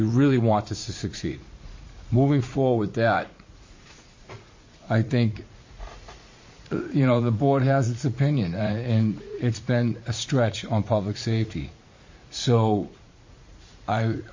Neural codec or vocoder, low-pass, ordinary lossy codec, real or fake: vocoder, 44.1 kHz, 80 mel bands, Vocos; 7.2 kHz; MP3, 32 kbps; fake